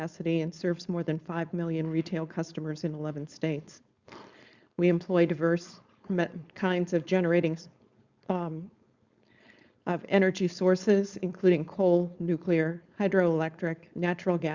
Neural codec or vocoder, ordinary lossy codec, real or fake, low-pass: codec, 16 kHz, 4.8 kbps, FACodec; Opus, 64 kbps; fake; 7.2 kHz